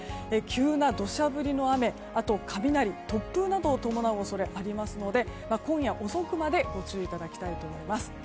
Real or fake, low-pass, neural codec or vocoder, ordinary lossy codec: real; none; none; none